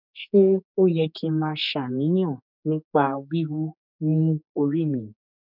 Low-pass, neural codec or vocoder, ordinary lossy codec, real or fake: 5.4 kHz; codec, 16 kHz, 4 kbps, X-Codec, HuBERT features, trained on general audio; none; fake